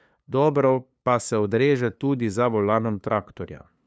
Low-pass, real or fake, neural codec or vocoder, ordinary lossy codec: none; fake; codec, 16 kHz, 2 kbps, FunCodec, trained on LibriTTS, 25 frames a second; none